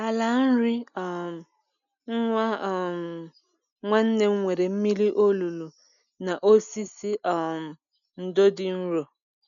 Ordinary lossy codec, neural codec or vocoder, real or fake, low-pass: none; none; real; 7.2 kHz